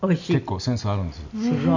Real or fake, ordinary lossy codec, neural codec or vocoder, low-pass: real; none; none; 7.2 kHz